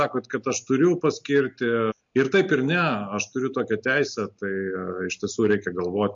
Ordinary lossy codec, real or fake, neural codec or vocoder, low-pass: MP3, 48 kbps; real; none; 7.2 kHz